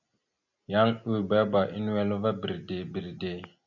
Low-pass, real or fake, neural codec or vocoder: 7.2 kHz; real; none